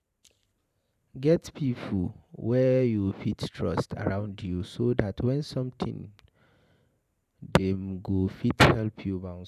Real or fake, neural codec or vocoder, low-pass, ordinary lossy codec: fake; vocoder, 44.1 kHz, 128 mel bands every 512 samples, BigVGAN v2; 14.4 kHz; none